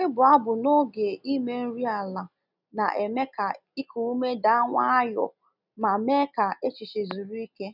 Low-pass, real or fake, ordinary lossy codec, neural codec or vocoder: 5.4 kHz; real; none; none